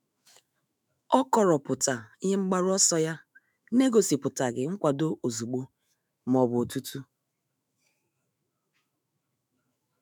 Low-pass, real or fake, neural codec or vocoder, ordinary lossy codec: none; fake; autoencoder, 48 kHz, 128 numbers a frame, DAC-VAE, trained on Japanese speech; none